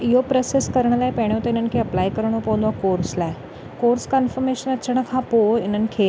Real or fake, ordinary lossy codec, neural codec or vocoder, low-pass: real; none; none; none